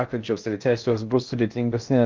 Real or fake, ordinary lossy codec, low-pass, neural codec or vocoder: fake; Opus, 24 kbps; 7.2 kHz; codec, 16 kHz in and 24 kHz out, 0.6 kbps, FocalCodec, streaming, 4096 codes